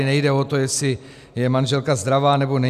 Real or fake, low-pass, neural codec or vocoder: real; 14.4 kHz; none